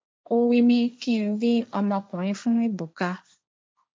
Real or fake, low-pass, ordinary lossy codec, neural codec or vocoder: fake; none; none; codec, 16 kHz, 1.1 kbps, Voila-Tokenizer